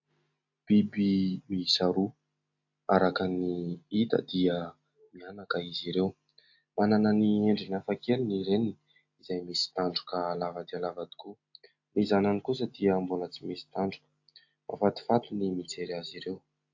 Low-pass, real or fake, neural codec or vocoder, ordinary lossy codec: 7.2 kHz; real; none; AAC, 48 kbps